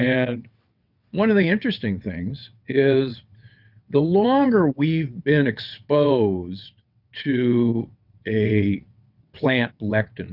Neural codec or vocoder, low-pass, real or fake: vocoder, 22.05 kHz, 80 mel bands, WaveNeXt; 5.4 kHz; fake